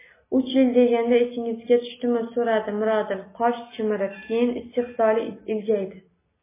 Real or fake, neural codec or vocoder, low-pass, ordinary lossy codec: real; none; 3.6 kHz; MP3, 16 kbps